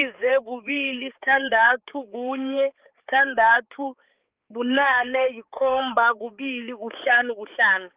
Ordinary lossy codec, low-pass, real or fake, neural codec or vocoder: Opus, 32 kbps; 3.6 kHz; fake; codec, 16 kHz, 4 kbps, X-Codec, HuBERT features, trained on balanced general audio